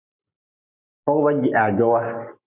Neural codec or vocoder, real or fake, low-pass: none; real; 3.6 kHz